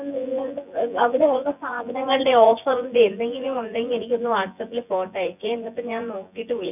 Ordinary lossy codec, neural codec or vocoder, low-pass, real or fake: none; vocoder, 24 kHz, 100 mel bands, Vocos; 3.6 kHz; fake